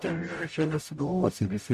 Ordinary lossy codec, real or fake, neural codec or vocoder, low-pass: AAC, 96 kbps; fake; codec, 44.1 kHz, 0.9 kbps, DAC; 14.4 kHz